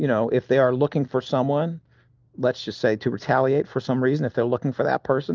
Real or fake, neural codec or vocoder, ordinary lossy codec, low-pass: real; none; Opus, 32 kbps; 7.2 kHz